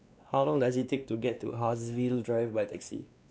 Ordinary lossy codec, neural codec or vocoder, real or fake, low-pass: none; codec, 16 kHz, 2 kbps, X-Codec, WavLM features, trained on Multilingual LibriSpeech; fake; none